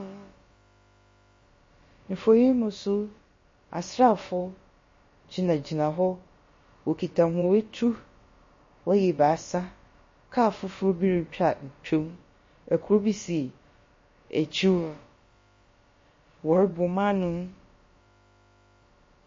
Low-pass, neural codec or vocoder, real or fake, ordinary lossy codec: 7.2 kHz; codec, 16 kHz, about 1 kbps, DyCAST, with the encoder's durations; fake; MP3, 32 kbps